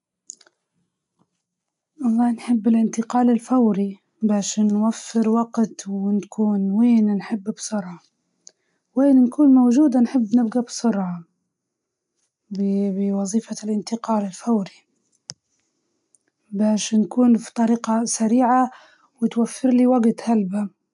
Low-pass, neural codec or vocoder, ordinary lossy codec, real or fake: 10.8 kHz; none; none; real